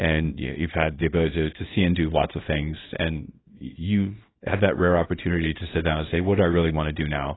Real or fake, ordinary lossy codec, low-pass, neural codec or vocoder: fake; AAC, 16 kbps; 7.2 kHz; codec, 24 kHz, 0.9 kbps, WavTokenizer, small release